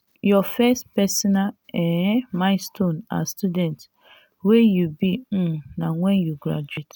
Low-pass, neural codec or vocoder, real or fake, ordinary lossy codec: 19.8 kHz; none; real; none